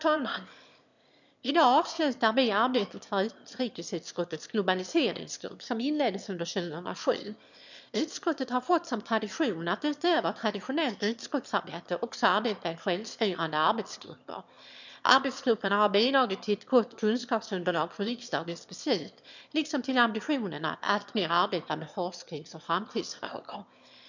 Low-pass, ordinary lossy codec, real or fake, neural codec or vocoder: 7.2 kHz; none; fake; autoencoder, 22.05 kHz, a latent of 192 numbers a frame, VITS, trained on one speaker